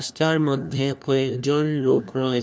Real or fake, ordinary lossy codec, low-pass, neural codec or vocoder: fake; none; none; codec, 16 kHz, 1 kbps, FunCodec, trained on LibriTTS, 50 frames a second